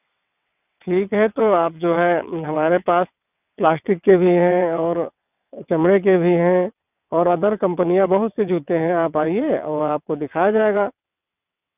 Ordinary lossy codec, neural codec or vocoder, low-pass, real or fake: none; vocoder, 22.05 kHz, 80 mel bands, WaveNeXt; 3.6 kHz; fake